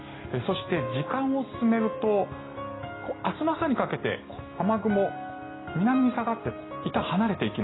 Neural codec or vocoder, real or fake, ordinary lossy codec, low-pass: none; real; AAC, 16 kbps; 7.2 kHz